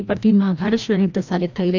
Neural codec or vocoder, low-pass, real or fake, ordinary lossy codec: codec, 16 kHz, 1 kbps, FreqCodec, larger model; 7.2 kHz; fake; none